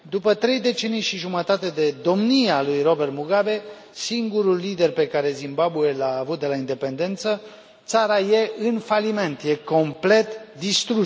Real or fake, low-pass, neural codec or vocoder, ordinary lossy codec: real; none; none; none